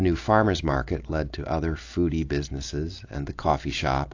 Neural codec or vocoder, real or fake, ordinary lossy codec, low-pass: none; real; AAC, 48 kbps; 7.2 kHz